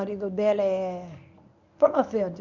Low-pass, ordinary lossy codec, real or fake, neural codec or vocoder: 7.2 kHz; none; fake; codec, 24 kHz, 0.9 kbps, WavTokenizer, medium speech release version 1